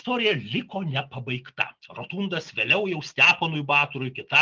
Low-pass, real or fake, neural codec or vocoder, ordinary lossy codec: 7.2 kHz; real; none; Opus, 32 kbps